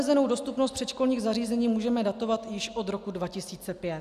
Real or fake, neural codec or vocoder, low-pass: real; none; 14.4 kHz